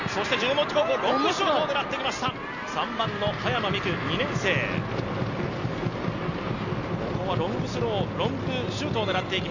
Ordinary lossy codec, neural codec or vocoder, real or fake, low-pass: none; none; real; 7.2 kHz